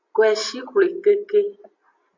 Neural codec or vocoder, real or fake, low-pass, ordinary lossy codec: none; real; 7.2 kHz; MP3, 64 kbps